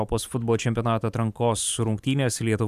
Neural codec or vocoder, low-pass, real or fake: vocoder, 44.1 kHz, 128 mel bands every 512 samples, BigVGAN v2; 14.4 kHz; fake